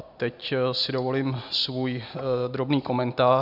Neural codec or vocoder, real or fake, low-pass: none; real; 5.4 kHz